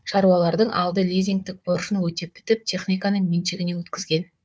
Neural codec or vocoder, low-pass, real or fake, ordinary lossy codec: codec, 16 kHz, 4 kbps, FunCodec, trained on Chinese and English, 50 frames a second; none; fake; none